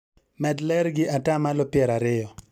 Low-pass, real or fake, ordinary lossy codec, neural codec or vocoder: 19.8 kHz; real; none; none